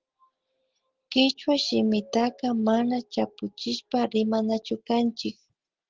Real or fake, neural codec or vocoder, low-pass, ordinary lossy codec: real; none; 7.2 kHz; Opus, 16 kbps